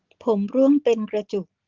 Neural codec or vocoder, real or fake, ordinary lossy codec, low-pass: none; real; Opus, 16 kbps; 7.2 kHz